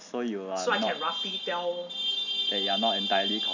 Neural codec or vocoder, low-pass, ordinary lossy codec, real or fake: none; 7.2 kHz; none; real